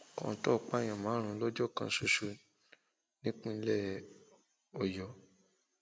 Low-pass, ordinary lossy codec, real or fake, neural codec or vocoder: none; none; real; none